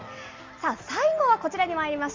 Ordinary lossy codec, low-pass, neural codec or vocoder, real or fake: Opus, 32 kbps; 7.2 kHz; none; real